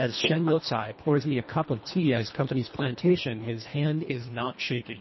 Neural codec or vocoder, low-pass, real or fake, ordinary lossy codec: codec, 24 kHz, 1.5 kbps, HILCodec; 7.2 kHz; fake; MP3, 24 kbps